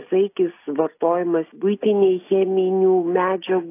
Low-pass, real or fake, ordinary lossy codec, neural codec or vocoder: 3.6 kHz; real; AAC, 24 kbps; none